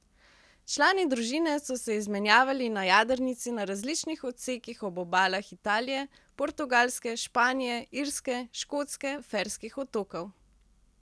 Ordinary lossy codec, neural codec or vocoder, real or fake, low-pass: none; none; real; none